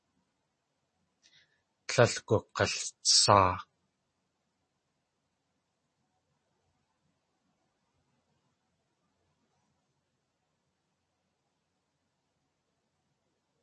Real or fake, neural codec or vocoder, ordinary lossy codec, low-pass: real; none; MP3, 32 kbps; 10.8 kHz